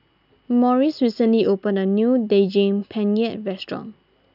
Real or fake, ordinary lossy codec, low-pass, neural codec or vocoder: real; none; 5.4 kHz; none